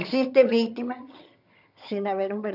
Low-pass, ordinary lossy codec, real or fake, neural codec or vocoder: 5.4 kHz; none; fake; vocoder, 22.05 kHz, 80 mel bands, HiFi-GAN